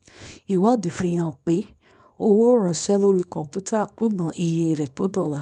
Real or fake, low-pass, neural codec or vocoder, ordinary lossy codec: fake; 10.8 kHz; codec, 24 kHz, 0.9 kbps, WavTokenizer, small release; none